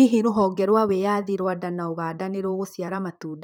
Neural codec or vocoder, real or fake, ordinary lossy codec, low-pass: vocoder, 44.1 kHz, 128 mel bands, Pupu-Vocoder; fake; none; 19.8 kHz